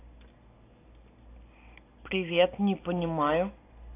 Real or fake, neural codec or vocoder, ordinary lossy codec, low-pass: real; none; AAC, 24 kbps; 3.6 kHz